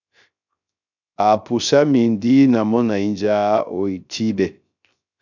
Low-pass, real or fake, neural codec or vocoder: 7.2 kHz; fake; codec, 16 kHz, 0.3 kbps, FocalCodec